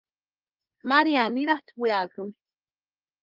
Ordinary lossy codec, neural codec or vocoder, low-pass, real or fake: Opus, 32 kbps; codec, 24 kHz, 1 kbps, SNAC; 5.4 kHz; fake